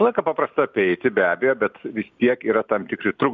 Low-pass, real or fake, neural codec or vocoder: 7.2 kHz; real; none